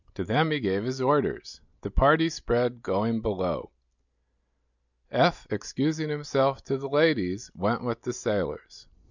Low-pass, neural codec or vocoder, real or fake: 7.2 kHz; none; real